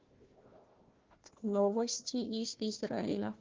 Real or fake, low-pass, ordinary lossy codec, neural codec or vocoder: fake; 7.2 kHz; Opus, 16 kbps; codec, 16 kHz, 1 kbps, FunCodec, trained on Chinese and English, 50 frames a second